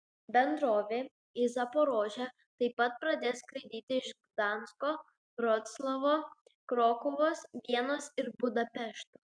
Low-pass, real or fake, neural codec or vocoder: 9.9 kHz; real; none